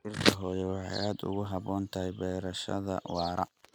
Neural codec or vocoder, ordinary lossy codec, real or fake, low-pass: none; none; real; none